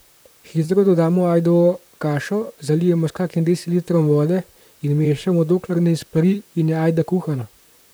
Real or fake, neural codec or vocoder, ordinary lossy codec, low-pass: fake; vocoder, 44.1 kHz, 128 mel bands, Pupu-Vocoder; none; none